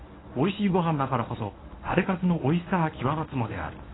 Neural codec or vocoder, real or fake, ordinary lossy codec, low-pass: codec, 24 kHz, 0.9 kbps, WavTokenizer, medium speech release version 1; fake; AAC, 16 kbps; 7.2 kHz